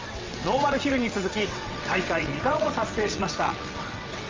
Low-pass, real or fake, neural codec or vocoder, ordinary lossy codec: 7.2 kHz; fake; vocoder, 22.05 kHz, 80 mel bands, WaveNeXt; Opus, 32 kbps